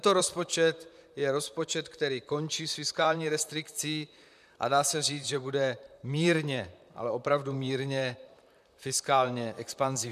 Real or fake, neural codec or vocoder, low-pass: fake; vocoder, 44.1 kHz, 128 mel bands, Pupu-Vocoder; 14.4 kHz